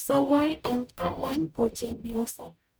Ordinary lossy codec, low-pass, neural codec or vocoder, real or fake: none; none; codec, 44.1 kHz, 0.9 kbps, DAC; fake